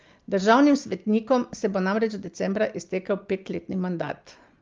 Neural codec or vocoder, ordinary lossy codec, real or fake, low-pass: none; Opus, 32 kbps; real; 7.2 kHz